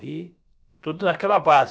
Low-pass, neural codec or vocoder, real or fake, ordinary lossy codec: none; codec, 16 kHz, about 1 kbps, DyCAST, with the encoder's durations; fake; none